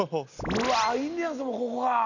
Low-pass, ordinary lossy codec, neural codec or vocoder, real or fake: 7.2 kHz; none; none; real